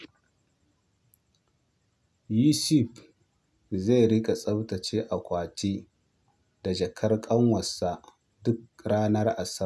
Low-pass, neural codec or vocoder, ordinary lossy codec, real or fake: none; none; none; real